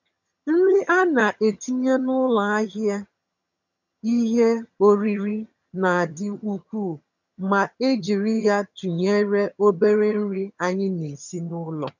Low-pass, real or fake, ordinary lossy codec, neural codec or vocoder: 7.2 kHz; fake; none; vocoder, 22.05 kHz, 80 mel bands, HiFi-GAN